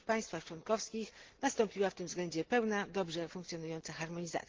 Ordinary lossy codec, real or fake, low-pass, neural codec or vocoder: Opus, 16 kbps; real; 7.2 kHz; none